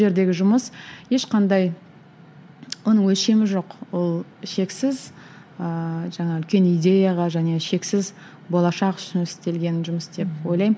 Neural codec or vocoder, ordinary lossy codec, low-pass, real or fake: none; none; none; real